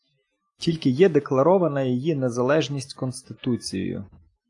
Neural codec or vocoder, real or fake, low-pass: none; real; 10.8 kHz